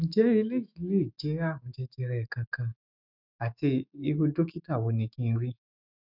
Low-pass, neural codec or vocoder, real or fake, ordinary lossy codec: 5.4 kHz; autoencoder, 48 kHz, 128 numbers a frame, DAC-VAE, trained on Japanese speech; fake; none